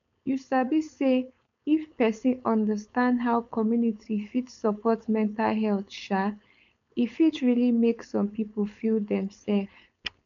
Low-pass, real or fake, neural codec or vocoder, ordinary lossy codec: 7.2 kHz; fake; codec, 16 kHz, 4.8 kbps, FACodec; none